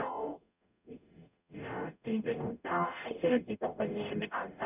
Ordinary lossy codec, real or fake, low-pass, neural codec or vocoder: none; fake; 3.6 kHz; codec, 44.1 kHz, 0.9 kbps, DAC